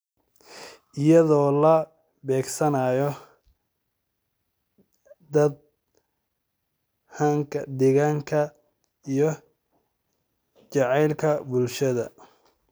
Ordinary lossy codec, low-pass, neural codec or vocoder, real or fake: none; none; none; real